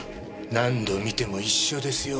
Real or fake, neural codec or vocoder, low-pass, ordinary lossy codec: real; none; none; none